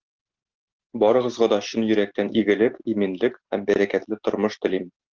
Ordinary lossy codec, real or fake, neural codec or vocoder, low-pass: Opus, 16 kbps; real; none; 7.2 kHz